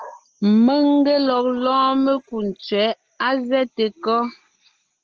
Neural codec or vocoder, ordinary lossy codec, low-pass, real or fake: none; Opus, 16 kbps; 7.2 kHz; real